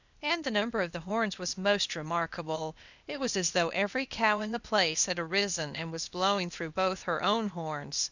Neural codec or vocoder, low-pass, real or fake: codec, 16 kHz, 0.8 kbps, ZipCodec; 7.2 kHz; fake